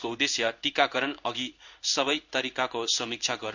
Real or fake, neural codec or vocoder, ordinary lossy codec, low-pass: fake; codec, 16 kHz in and 24 kHz out, 1 kbps, XY-Tokenizer; none; 7.2 kHz